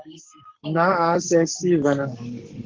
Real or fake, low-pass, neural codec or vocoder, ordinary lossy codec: fake; 7.2 kHz; codec, 44.1 kHz, 7.8 kbps, Pupu-Codec; Opus, 16 kbps